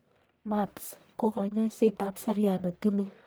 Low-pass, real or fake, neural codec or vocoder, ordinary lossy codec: none; fake; codec, 44.1 kHz, 1.7 kbps, Pupu-Codec; none